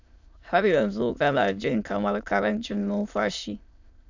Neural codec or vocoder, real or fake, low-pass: autoencoder, 22.05 kHz, a latent of 192 numbers a frame, VITS, trained on many speakers; fake; 7.2 kHz